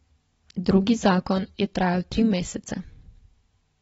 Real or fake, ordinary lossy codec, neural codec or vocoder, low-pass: real; AAC, 24 kbps; none; 9.9 kHz